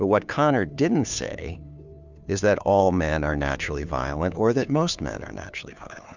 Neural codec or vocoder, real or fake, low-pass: codec, 16 kHz, 2 kbps, FunCodec, trained on Chinese and English, 25 frames a second; fake; 7.2 kHz